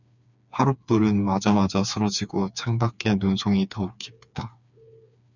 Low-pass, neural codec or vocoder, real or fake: 7.2 kHz; codec, 16 kHz, 4 kbps, FreqCodec, smaller model; fake